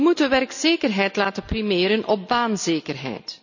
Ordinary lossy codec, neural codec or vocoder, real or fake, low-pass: none; none; real; 7.2 kHz